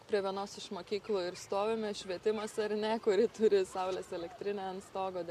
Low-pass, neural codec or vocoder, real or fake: 14.4 kHz; none; real